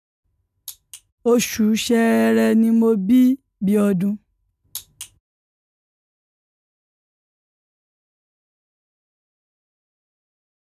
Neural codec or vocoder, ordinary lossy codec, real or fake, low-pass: none; none; real; 14.4 kHz